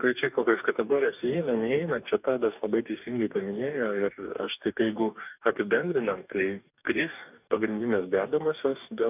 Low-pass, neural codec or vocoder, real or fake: 3.6 kHz; codec, 44.1 kHz, 2.6 kbps, DAC; fake